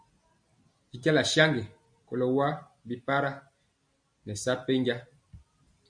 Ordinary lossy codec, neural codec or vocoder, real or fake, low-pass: AAC, 64 kbps; none; real; 9.9 kHz